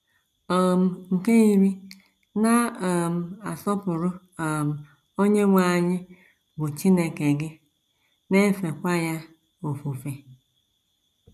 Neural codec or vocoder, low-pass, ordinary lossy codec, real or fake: none; 14.4 kHz; none; real